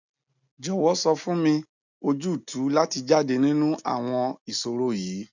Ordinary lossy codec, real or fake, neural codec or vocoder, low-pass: none; real; none; 7.2 kHz